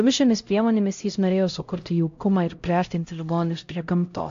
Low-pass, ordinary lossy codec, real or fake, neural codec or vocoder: 7.2 kHz; AAC, 48 kbps; fake; codec, 16 kHz, 0.5 kbps, X-Codec, HuBERT features, trained on LibriSpeech